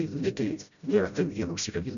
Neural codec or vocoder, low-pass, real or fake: codec, 16 kHz, 0.5 kbps, FreqCodec, smaller model; 7.2 kHz; fake